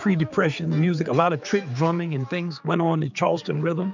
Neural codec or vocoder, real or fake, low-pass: codec, 16 kHz, 4 kbps, X-Codec, HuBERT features, trained on general audio; fake; 7.2 kHz